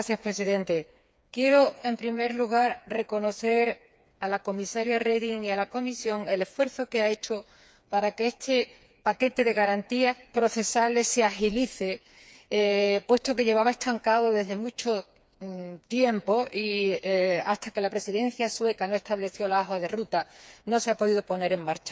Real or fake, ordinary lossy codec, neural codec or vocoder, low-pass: fake; none; codec, 16 kHz, 4 kbps, FreqCodec, smaller model; none